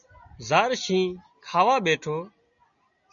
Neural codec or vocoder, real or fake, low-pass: none; real; 7.2 kHz